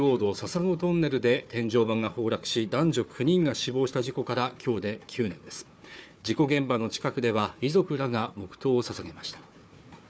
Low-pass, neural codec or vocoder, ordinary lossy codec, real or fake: none; codec, 16 kHz, 4 kbps, FunCodec, trained on Chinese and English, 50 frames a second; none; fake